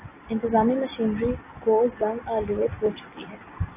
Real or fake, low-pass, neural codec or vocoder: real; 3.6 kHz; none